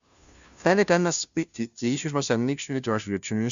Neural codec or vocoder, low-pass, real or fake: codec, 16 kHz, 0.5 kbps, FunCodec, trained on Chinese and English, 25 frames a second; 7.2 kHz; fake